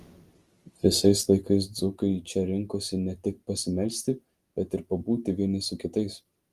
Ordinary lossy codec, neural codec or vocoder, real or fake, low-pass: Opus, 24 kbps; none; real; 14.4 kHz